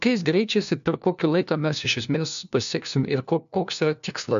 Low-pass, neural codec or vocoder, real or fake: 7.2 kHz; codec, 16 kHz, 1 kbps, FunCodec, trained on LibriTTS, 50 frames a second; fake